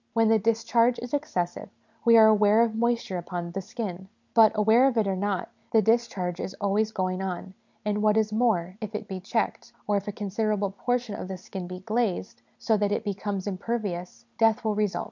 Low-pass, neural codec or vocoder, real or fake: 7.2 kHz; none; real